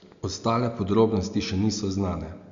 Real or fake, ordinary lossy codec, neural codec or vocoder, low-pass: real; Opus, 64 kbps; none; 7.2 kHz